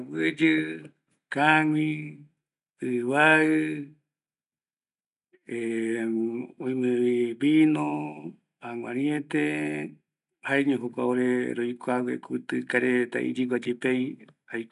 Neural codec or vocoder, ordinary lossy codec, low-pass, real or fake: none; none; 10.8 kHz; real